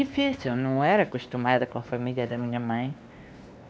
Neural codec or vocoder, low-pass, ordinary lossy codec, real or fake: codec, 16 kHz, 2 kbps, X-Codec, WavLM features, trained on Multilingual LibriSpeech; none; none; fake